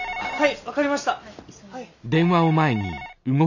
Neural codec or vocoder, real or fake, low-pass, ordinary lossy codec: none; real; 7.2 kHz; none